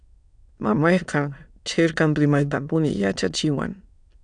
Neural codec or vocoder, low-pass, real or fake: autoencoder, 22.05 kHz, a latent of 192 numbers a frame, VITS, trained on many speakers; 9.9 kHz; fake